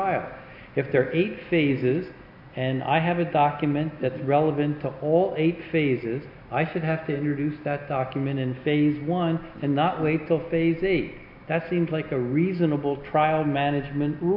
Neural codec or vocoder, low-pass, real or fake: none; 5.4 kHz; real